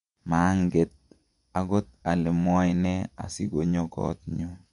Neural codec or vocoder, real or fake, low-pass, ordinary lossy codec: vocoder, 24 kHz, 100 mel bands, Vocos; fake; 10.8 kHz; MP3, 64 kbps